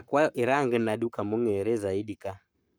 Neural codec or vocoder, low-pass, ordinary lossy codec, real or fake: codec, 44.1 kHz, 7.8 kbps, Pupu-Codec; none; none; fake